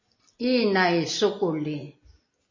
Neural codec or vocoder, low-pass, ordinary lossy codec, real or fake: none; 7.2 kHz; MP3, 32 kbps; real